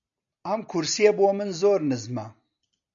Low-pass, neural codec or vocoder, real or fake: 7.2 kHz; none; real